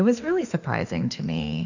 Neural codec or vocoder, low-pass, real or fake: autoencoder, 48 kHz, 32 numbers a frame, DAC-VAE, trained on Japanese speech; 7.2 kHz; fake